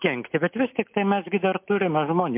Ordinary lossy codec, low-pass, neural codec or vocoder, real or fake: MP3, 32 kbps; 3.6 kHz; none; real